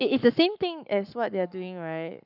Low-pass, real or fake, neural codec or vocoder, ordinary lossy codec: 5.4 kHz; fake; codec, 44.1 kHz, 7.8 kbps, Pupu-Codec; none